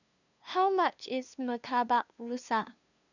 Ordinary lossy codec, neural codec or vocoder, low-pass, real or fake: none; codec, 16 kHz, 2 kbps, FunCodec, trained on LibriTTS, 25 frames a second; 7.2 kHz; fake